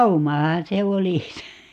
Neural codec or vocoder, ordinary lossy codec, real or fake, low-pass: none; none; real; 14.4 kHz